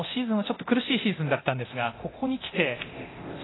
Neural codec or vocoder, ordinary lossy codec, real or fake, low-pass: codec, 24 kHz, 0.9 kbps, DualCodec; AAC, 16 kbps; fake; 7.2 kHz